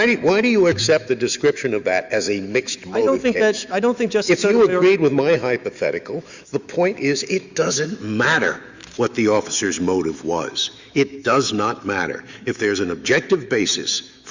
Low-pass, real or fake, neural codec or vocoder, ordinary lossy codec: 7.2 kHz; fake; autoencoder, 48 kHz, 128 numbers a frame, DAC-VAE, trained on Japanese speech; Opus, 64 kbps